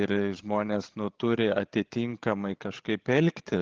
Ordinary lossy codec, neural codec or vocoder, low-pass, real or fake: Opus, 16 kbps; codec, 16 kHz, 16 kbps, FreqCodec, larger model; 7.2 kHz; fake